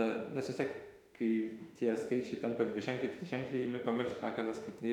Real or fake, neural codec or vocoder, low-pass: fake; autoencoder, 48 kHz, 32 numbers a frame, DAC-VAE, trained on Japanese speech; 19.8 kHz